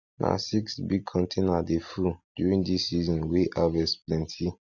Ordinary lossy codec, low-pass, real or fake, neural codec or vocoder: Opus, 64 kbps; 7.2 kHz; real; none